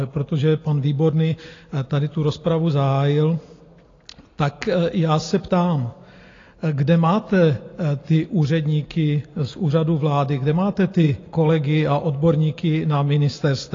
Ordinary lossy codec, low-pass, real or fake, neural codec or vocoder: AAC, 32 kbps; 7.2 kHz; real; none